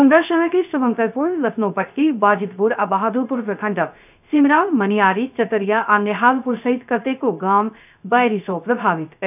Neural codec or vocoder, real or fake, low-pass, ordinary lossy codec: codec, 16 kHz, 0.3 kbps, FocalCodec; fake; 3.6 kHz; none